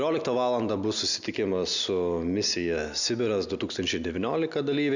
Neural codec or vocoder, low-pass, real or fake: none; 7.2 kHz; real